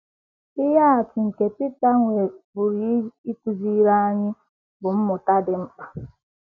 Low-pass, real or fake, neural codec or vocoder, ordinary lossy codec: 7.2 kHz; real; none; none